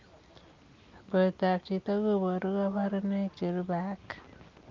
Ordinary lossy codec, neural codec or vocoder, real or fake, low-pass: Opus, 24 kbps; none; real; 7.2 kHz